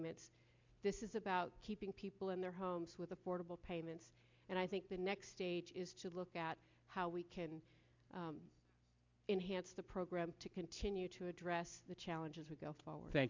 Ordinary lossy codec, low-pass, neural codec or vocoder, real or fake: MP3, 64 kbps; 7.2 kHz; none; real